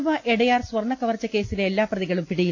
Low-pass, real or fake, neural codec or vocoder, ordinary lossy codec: none; real; none; none